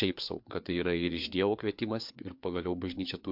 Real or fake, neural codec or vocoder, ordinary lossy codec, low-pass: fake; codec, 16 kHz, 4 kbps, FreqCodec, larger model; MP3, 48 kbps; 5.4 kHz